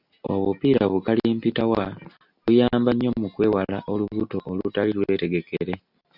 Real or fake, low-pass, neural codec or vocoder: real; 5.4 kHz; none